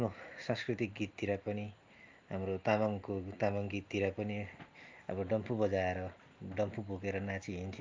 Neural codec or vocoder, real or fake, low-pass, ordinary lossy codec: none; real; 7.2 kHz; Opus, 24 kbps